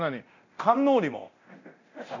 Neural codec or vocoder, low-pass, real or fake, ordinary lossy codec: codec, 24 kHz, 0.9 kbps, DualCodec; 7.2 kHz; fake; none